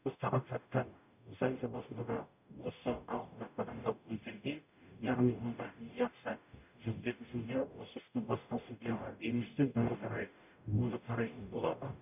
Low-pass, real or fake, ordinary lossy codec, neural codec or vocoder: 3.6 kHz; fake; none; codec, 44.1 kHz, 0.9 kbps, DAC